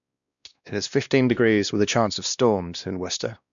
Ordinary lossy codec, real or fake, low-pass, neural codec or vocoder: none; fake; 7.2 kHz; codec, 16 kHz, 1 kbps, X-Codec, WavLM features, trained on Multilingual LibriSpeech